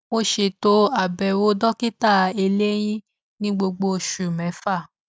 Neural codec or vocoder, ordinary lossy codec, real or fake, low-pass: none; none; real; none